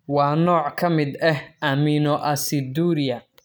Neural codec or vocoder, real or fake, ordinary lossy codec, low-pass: none; real; none; none